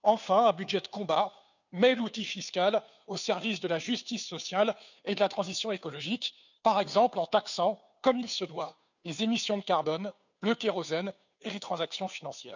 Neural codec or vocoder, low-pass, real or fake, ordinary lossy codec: codec, 16 kHz, 2 kbps, FunCodec, trained on Chinese and English, 25 frames a second; 7.2 kHz; fake; none